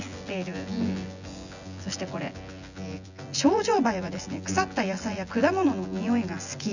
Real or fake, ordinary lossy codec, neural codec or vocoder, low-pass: fake; none; vocoder, 24 kHz, 100 mel bands, Vocos; 7.2 kHz